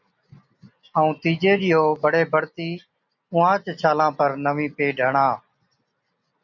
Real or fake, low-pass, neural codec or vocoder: real; 7.2 kHz; none